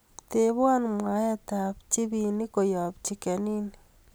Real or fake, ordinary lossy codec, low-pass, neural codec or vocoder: real; none; none; none